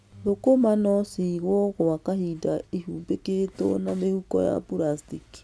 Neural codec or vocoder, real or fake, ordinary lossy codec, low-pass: none; real; none; none